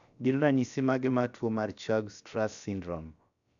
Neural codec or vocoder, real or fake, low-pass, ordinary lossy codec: codec, 16 kHz, about 1 kbps, DyCAST, with the encoder's durations; fake; 7.2 kHz; none